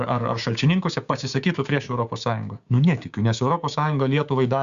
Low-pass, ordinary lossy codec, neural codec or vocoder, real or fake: 7.2 kHz; Opus, 64 kbps; codec, 16 kHz, 6 kbps, DAC; fake